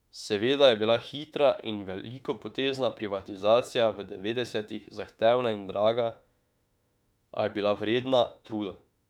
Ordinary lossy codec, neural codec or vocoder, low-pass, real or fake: none; autoencoder, 48 kHz, 32 numbers a frame, DAC-VAE, trained on Japanese speech; 19.8 kHz; fake